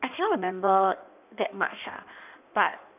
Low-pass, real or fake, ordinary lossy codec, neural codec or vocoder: 3.6 kHz; fake; none; codec, 16 kHz in and 24 kHz out, 1.1 kbps, FireRedTTS-2 codec